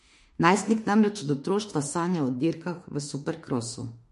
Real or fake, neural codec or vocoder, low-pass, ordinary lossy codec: fake; autoencoder, 48 kHz, 32 numbers a frame, DAC-VAE, trained on Japanese speech; 14.4 kHz; MP3, 48 kbps